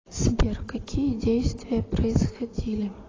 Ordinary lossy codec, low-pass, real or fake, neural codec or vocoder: AAC, 32 kbps; 7.2 kHz; real; none